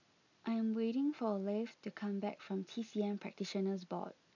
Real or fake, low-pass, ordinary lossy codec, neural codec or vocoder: real; 7.2 kHz; none; none